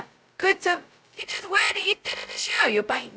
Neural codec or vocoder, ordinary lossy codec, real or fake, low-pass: codec, 16 kHz, 0.2 kbps, FocalCodec; none; fake; none